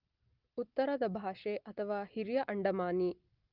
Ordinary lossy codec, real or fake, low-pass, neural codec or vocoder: Opus, 24 kbps; real; 5.4 kHz; none